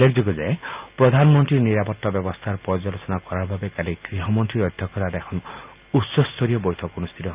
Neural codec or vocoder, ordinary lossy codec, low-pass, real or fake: none; Opus, 24 kbps; 3.6 kHz; real